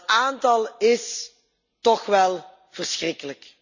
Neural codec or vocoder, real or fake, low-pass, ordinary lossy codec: none; real; 7.2 kHz; none